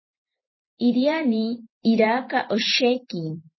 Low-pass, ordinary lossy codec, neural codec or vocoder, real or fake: 7.2 kHz; MP3, 24 kbps; vocoder, 44.1 kHz, 128 mel bands every 512 samples, BigVGAN v2; fake